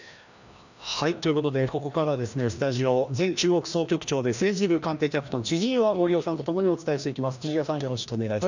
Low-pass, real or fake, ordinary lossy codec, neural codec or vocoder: 7.2 kHz; fake; none; codec, 16 kHz, 1 kbps, FreqCodec, larger model